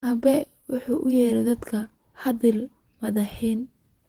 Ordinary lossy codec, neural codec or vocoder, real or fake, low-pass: Opus, 16 kbps; vocoder, 48 kHz, 128 mel bands, Vocos; fake; 19.8 kHz